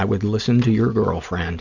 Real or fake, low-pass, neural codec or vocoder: real; 7.2 kHz; none